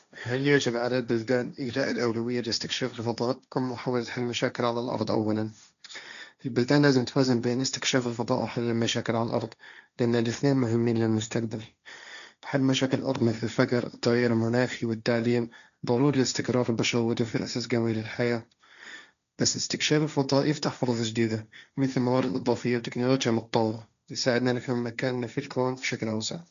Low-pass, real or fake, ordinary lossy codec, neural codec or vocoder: 7.2 kHz; fake; none; codec, 16 kHz, 1.1 kbps, Voila-Tokenizer